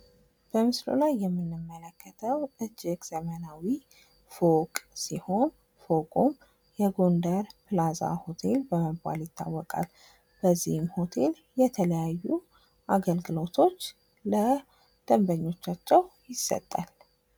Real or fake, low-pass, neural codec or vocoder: real; 19.8 kHz; none